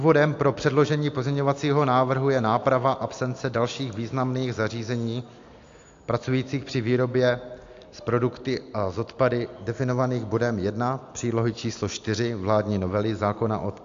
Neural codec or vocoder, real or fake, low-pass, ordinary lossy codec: none; real; 7.2 kHz; AAC, 64 kbps